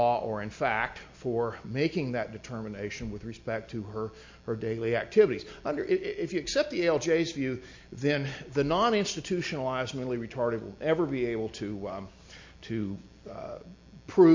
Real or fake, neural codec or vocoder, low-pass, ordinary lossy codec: real; none; 7.2 kHz; MP3, 48 kbps